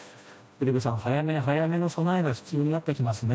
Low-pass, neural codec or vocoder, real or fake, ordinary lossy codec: none; codec, 16 kHz, 1 kbps, FreqCodec, smaller model; fake; none